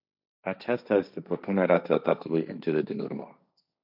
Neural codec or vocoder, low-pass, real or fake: codec, 16 kHz, 1.1 kbps, Voila-Tokenizer; 5.4 kHz; fake